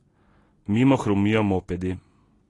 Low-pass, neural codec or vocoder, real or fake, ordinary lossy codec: 10.8 kHz; none; real; AAC, 32 kbps